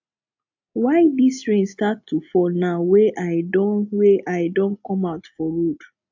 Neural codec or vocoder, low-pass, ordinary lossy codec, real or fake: none; 7.2 kHz; AAC, 48 kbps; real